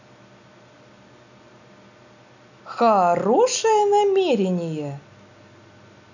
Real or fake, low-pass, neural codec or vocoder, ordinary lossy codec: real; 7.2 kHz; none; none